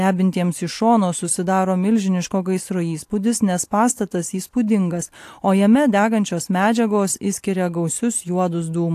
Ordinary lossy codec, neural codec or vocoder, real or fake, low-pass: AAC, 64 kbps; none; real; 14.4 kHz